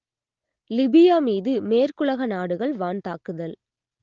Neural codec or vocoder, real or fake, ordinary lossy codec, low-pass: none; real; Opus, 16 kbps; 7.2 kHz